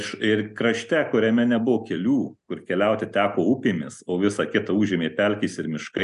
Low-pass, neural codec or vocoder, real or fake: 10.8 kHz; none; real